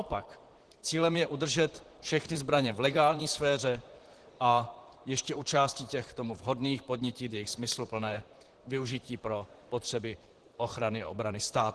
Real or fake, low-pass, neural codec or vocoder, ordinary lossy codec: fake; 10.8 kHz; vocoder, 44.1 kHz, 128 mel bands, Pupu-Vocoder; Opus, 16 kbps